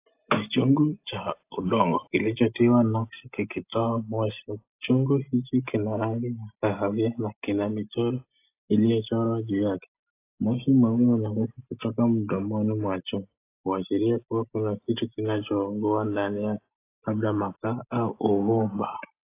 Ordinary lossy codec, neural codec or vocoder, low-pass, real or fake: AAC, 24 kbps; none; 3.6 kHz; real